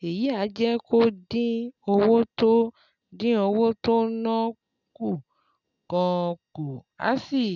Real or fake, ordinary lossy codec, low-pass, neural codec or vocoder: real; none; 7.2 kHz; none